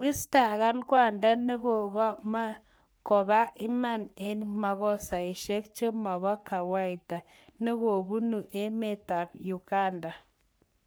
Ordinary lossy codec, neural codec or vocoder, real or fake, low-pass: none; codec, 44.1 kHz, 3.4 kbps, Pupu-Codec; fake; none